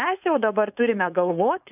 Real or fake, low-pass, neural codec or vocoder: fake; 3.6 kHz; codec, 16 kHz, 4.8 kbps, FACodec